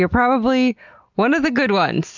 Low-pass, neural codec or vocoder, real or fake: 7.2 kHz; none; real